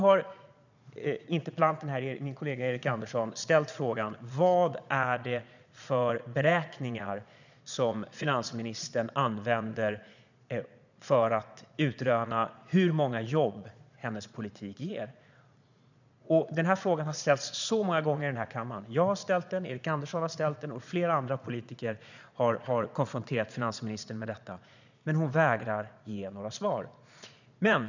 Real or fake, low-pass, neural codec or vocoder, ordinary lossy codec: fake; 7.2 kHz; vocoder, 22.05 kHz, 80 mel bands, Vocos; none